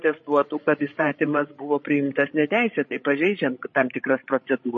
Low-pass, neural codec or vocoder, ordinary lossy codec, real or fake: 7.2 kHz; codec, 16 kHz, 16 kbps, FreqCodec, larger model; MP3, 32 kbps; fake